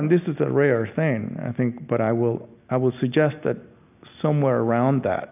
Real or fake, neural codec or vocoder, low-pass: real; none; 3.6 kHz